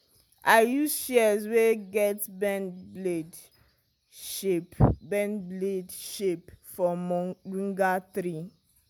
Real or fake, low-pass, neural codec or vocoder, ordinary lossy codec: real; none; none; none